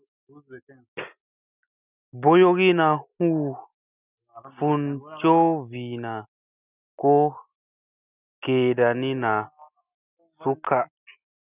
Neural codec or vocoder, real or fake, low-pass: none; real; 3.6 kHz